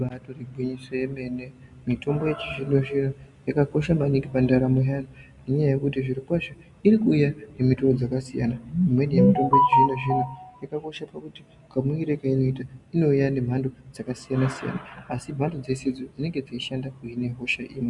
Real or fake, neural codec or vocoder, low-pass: real; none; 10.8 kHz